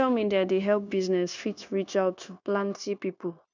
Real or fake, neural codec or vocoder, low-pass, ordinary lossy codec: fake; codec, 16 kHz, 0.9 kbps, LongCat-Audio-Codec; 7.2 kHz; none